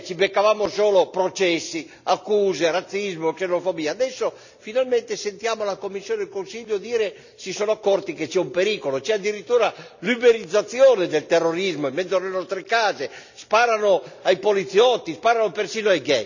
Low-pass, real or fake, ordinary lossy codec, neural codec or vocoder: 7.2 kHz; real; none; none